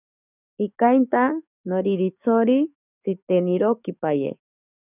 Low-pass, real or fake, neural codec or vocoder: 3.6 kHz; fake; codec, 44.1 kHz, 7.8 kbps, DAC